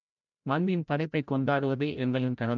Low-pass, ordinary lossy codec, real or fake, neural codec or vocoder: 7.2 kHz; MP3, 64 kbps; fake; codec, 16 kHz, 0.5 kbps, FreqCodec, larger model